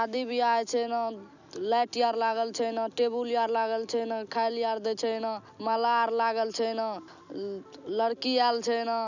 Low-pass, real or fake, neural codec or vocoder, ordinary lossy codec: 7.2 kHz; real; none; none